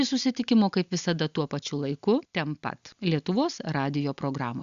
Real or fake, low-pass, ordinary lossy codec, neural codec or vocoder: real; 7.2 kHz; Opus, 64 kbps; none